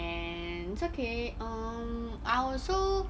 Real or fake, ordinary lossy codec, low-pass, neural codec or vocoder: real; none; none; none